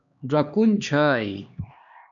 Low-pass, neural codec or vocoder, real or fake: 7.2 kHz; codec, 16 kHz, 2 kbps, X-Codec, HuBERT features, trained on LibriSpeech; fake